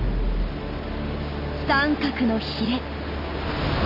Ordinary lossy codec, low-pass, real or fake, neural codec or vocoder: none; 5.4 kHz; real; none